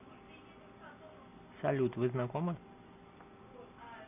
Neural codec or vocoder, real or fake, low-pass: none; real; 3.6 kHz